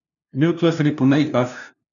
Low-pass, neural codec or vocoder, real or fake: 7.2 kHz; codec, 16 kHz, 0.5 kbps, FunCodec, trained on LibriTTS, 25 frames a second; fake